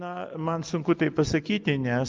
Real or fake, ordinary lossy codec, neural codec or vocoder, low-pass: real; Opus, 24 kbps; none; 7.2 kHz